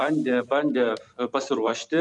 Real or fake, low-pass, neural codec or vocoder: fake; 10.8 kHz; vocoder, 44.1 kHz, 128 mel bands every 256 samples, BigVGAN v2